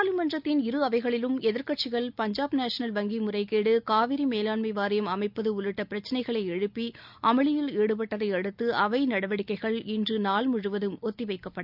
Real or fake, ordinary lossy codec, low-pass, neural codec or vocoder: real; none; 5.4 kHz; none